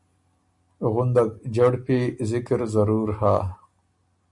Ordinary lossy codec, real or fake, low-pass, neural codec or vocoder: MP3, 64 kbps; real; 10.8 kHz; none